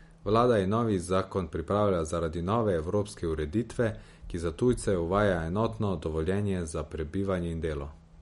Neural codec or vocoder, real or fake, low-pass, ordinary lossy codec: none; real; 19.8 kHz; MP3, 48 kbps